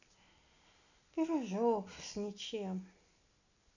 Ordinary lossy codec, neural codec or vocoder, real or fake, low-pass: none; none; real; 7.2 kHz